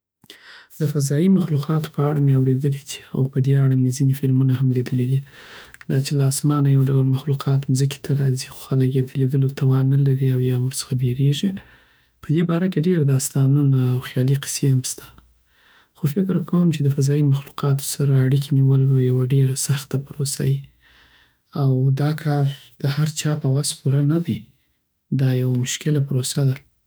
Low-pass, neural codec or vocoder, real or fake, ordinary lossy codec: none; autoencoder, 48 kHz, 32 numbers a frame, DAC-VAE, trained on Japanese speech; fake; none